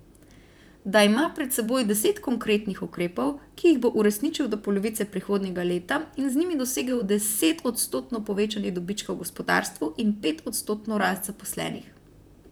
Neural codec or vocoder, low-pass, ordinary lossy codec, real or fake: vocoder, 44.1 kHz, 128 mel bands, Pupu-Vocoder; none; none; fake